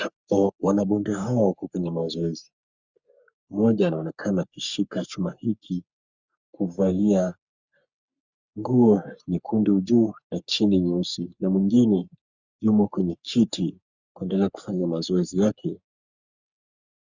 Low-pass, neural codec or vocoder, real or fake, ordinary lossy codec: 7.2 kHz; codec, 44.1 kHz, 3.4 kbps, Pupu-Codec; fake; Opus, 64 kbps